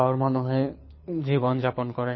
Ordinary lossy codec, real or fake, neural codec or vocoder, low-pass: MP3, 24 kbps; fake; codec, 24 kHz, 6 kbps, HILCodec; 7.2 kHz